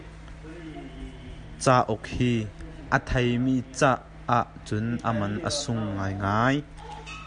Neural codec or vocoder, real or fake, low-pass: none; real; 9.9 kHz